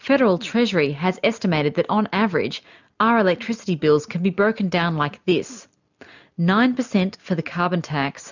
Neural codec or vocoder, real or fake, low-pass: none; real; 7.2 kHz